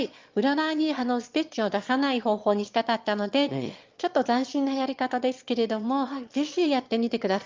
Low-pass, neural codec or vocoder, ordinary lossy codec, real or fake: 7.2 kHz; autoencoder, 22.05 kHz, a latent of 192 numbers a frame, VITS, trained on one speaker; Opus, 32 kbps; fake